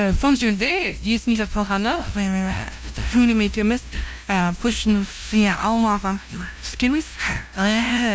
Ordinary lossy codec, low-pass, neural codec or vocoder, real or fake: none; none; codec, 16 kHz, 0.5 kbps, FunCodec, trained on LibriTTS, 25 frames a second; fake